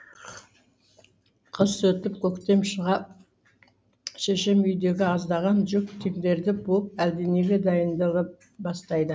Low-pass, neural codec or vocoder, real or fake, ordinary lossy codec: none; none; real; none